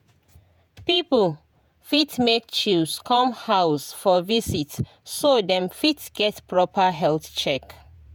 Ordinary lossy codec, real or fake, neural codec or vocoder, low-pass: none; fake; vocoder, 48 kHz, 128 mel bands, Vocos; none